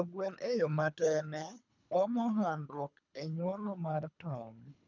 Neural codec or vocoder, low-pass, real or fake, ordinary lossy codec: codec, 24 kHz, 3 kbps, HILCodec; 7.2 kHz; fake; none